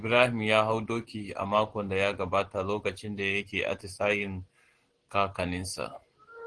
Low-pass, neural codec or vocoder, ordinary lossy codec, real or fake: 9.9 kHz; none; Opus, 16 kbps; real